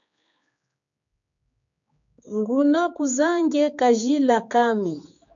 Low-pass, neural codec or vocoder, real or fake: 7.2 kHz; codec, 16 kHz, 4 kbps, X-Codec, HuBERT features, trained on general audio; fake